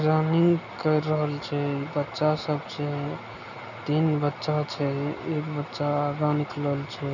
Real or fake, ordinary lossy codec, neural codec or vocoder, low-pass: real; none; none; 7.2 kHz